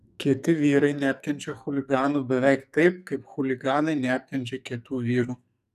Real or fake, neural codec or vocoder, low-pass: fake; codec, 44.1 kHz, 2.6 kbps, SNAC; 14.4 kHz